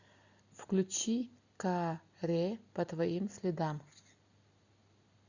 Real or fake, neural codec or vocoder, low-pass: real; none; 7.2 kHz